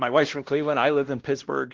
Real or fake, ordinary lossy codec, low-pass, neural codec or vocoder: fake; Opus, 16 kbps; 7.2 kHz; codec, 16 kHz, 1 kbps, X-Codec, WavLM features, trained on Multilingual LibriSpeech